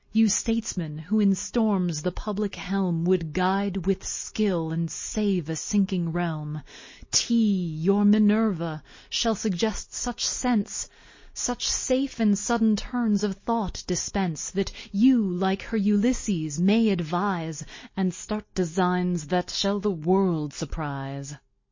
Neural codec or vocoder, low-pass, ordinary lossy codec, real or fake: none; 7.2 kHz; MP3, 32 kbps; real